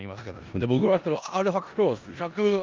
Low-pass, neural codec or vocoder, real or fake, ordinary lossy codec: 7.2 kHz; codec, 16 kHz in and 24 kHz out, 0.4 kbps, LongCat-Audio-Codec, four codebook decoder; fake; Opus, 24 kbps